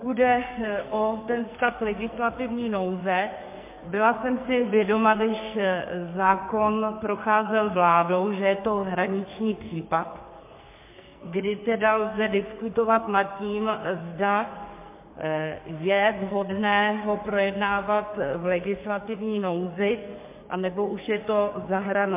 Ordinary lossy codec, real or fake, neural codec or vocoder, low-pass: MP3, 24 kbps; fake; codec, 44.1 kHz, 2.6 kbps, SNAC; 3.6 kHz